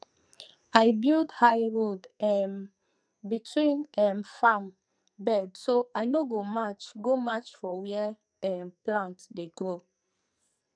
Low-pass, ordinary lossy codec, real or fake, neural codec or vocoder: 9.9 kHz; none; fake; codec, 44.1 kHz, 2.6 kbps, SNAC